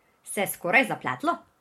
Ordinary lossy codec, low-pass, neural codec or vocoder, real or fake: MP3, 64 kbps; 19.8 kHz; vocoder, 44.1 kHz, 128 mel bands every 512 samples, BigVGAN v2; fake